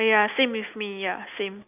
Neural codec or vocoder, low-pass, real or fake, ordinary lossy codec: none; 3.6 kHz; real; none